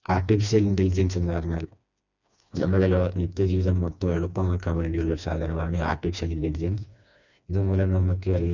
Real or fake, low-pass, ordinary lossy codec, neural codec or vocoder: fake; 7.2 kHz; none; codec, 16 kHz, 2 kbps, FreqCodec, smaller model